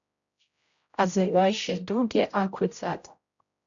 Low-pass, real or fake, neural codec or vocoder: 7.2 kHz; fake; codec, 16 kHz, 0.5 kbps, X-Codec, HuBERT features, trained on general audio